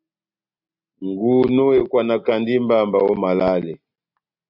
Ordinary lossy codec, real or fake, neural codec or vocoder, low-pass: MP3, 48 kbps; real; none; 5.4 kHz